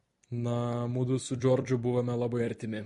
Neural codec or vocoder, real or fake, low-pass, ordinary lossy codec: vocoder, 44.1 kHz, 128 mel bands every 256 samples, BigVGAN v2; fake; 14.4 kHz; MP3, 48 kbps